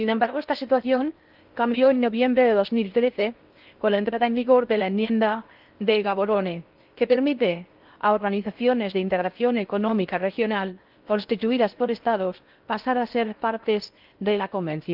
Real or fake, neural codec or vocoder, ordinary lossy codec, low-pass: fake; codec, 16 kHz in and 24 kHz out, 0.6 kbps, FocalCodec, streaming, 4096 codes; Opus, 32 kbps; 5.4 kHz